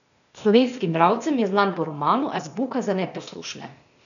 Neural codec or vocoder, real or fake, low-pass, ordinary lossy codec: codec, 16 kHz, 0.8 kbps, ZipCodec; fake; 7.2 kHz; none